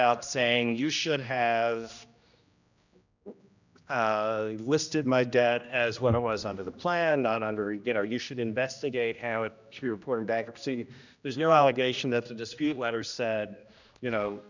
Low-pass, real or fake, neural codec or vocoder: 7.2 kHz; fake; codec, 16 kHz, 1 kbps, X-Codec, HuBERT features, trained on general audio